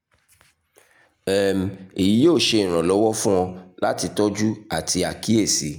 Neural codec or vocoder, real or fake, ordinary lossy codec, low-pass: none; real; none; none